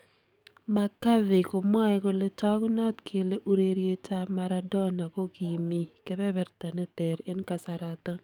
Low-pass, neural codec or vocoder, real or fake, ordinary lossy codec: 19.8 kHz; codec, 44.1 kHz, 7.8 kbps, DAC; fake; none